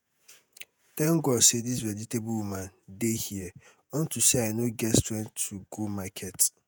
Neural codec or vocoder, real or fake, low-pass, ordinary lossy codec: vocoder, 48 kHz, 128 mel bands, Vocos; fake; none; none